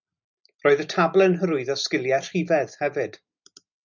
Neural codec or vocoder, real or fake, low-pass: none; real; 7.2 kHz